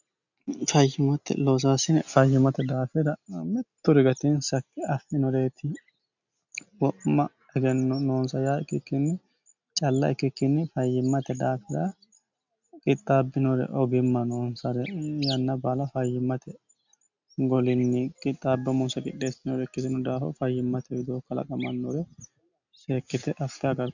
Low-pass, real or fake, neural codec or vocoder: 7.2 kHz; real; none